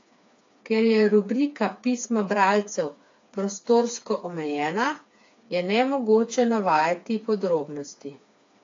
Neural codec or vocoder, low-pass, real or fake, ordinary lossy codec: codec, 16 kHz, 4 kbps, FreqCodec, smaller model; 7.2 kHz; fake; AAC, 48 kbps